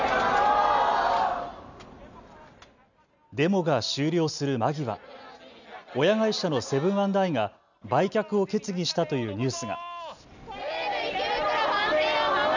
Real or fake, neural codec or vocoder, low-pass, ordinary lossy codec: real; none; 7.2 kHz; none